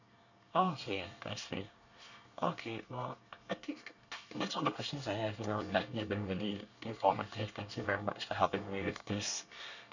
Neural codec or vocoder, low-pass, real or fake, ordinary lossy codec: codec, 24 kHz, 1 kbps, SNAC; 7.2 kHz; fake; none